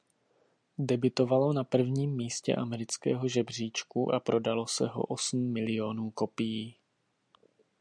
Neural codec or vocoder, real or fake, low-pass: none; real; 9.9 kHz